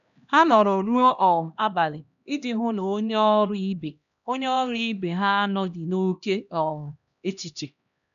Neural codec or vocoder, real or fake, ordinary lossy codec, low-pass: codec, 16 kHz, 1 kbps, X-Codec, HuBERT features, trained on LibriSpeech; fake; none; 7.2 kHz